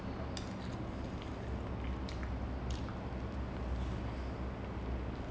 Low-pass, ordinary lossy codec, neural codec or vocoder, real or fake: none; none; none; real